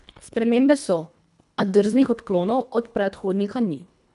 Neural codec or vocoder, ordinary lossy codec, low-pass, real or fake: codec, 24 kHz, 1.5 kbps, HILCodec; none; 10.8 kHz; fake